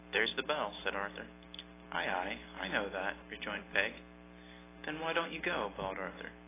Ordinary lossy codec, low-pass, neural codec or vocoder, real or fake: AAC, 16 kbps; 3.6 kHz; none; real